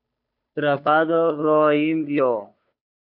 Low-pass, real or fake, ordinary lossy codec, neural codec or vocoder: 5.4 kHz; fake; AAC, 48 kbps; codec, 16 kHz, 2 kbps, FunCodec, trained on Chinese and English, 25 frames a second